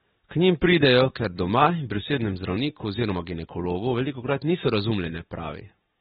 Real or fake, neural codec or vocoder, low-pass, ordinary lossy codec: real; none; 10.8 kHz; AAC, 16 kbps